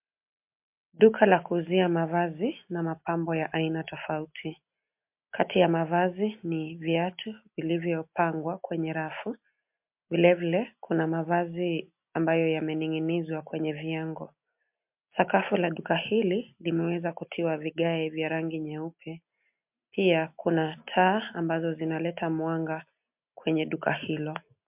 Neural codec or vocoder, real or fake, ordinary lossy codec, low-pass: none; real; MP3, 32 kbps; 3.6 kHz